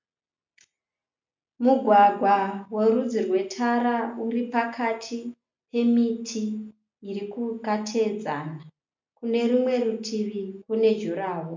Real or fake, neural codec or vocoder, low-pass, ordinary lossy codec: real; none; 7.2 kHz; MP3, 64 kbps